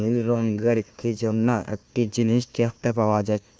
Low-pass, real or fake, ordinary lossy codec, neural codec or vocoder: none; fake; none; codec, 16 kHz, 1 kbps, FunCodec, trained on Chinese and English, 50 frames a second